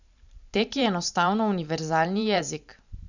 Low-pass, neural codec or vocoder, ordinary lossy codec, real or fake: 7.2 kHz; vocoder, 44.1 kHz, 128 mel bands every 512 samples, BigVGAN v2; none; fake